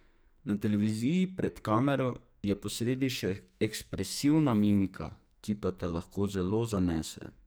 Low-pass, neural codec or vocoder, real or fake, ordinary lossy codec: none; codec, 44.1 kHz, 2.6 kbps, SNAC; fake; none